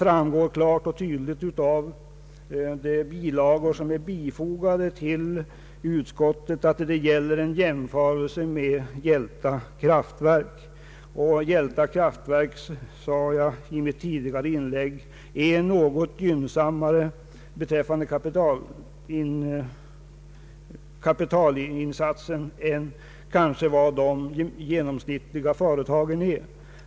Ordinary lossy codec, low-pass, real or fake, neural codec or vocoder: none; none; real; none